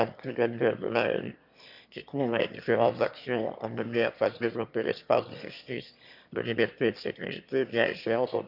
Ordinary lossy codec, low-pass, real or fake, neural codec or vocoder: none; 5.4 kHz; fake; autoencoder, 22.05 kHz, a latent of 192 numbers a frame, VITS, trained on one speaker